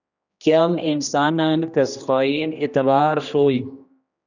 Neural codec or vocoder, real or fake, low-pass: codec, 16 kHz, 1 kbps, X-Codec, HuBERT features, trained on general audio; fake; 7.2 kHz